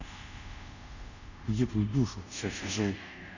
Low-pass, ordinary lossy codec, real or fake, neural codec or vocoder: 7.2 kHz; none; fake; codec, 24 kHz, 0.5 kbps, DualCodec